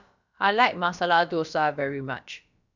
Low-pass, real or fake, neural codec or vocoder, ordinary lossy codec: 7.2 kHz; fake; codec, 16 kHz, about 1 kbps, DyCAST, with the encoder's durations; none